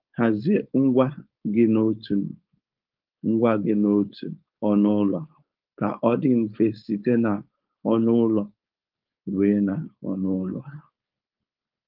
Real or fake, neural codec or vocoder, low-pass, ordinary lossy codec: fake; codec, 16 kHz, 4.8 kbps, FACodec; 5.4 kHz; Opus, 32 kbps